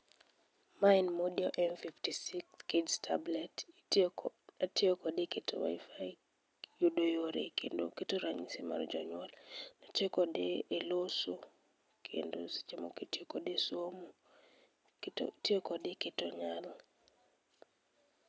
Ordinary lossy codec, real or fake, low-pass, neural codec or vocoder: none; real; none; none